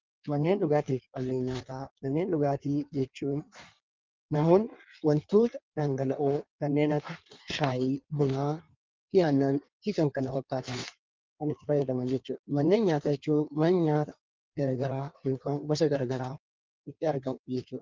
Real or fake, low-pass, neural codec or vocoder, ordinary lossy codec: fake; 7.2 kHz; codec, 16 kHz in and 24 kHz out, 1.1 kbps, FireRedTTS-2 codec; Opus, 24 kbps